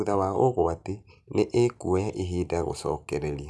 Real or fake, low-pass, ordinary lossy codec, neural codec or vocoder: real; none; none; none